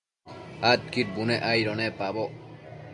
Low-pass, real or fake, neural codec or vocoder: 10.8 kHz; real; none